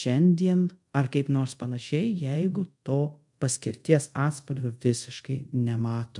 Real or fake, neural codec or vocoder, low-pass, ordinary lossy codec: fake; codec, 24 kHz, 0.5 kbps, DualCodec; 10.8 kHz; MP3, 64 kbps